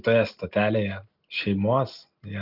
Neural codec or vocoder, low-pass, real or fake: none; 5.4 kHz; real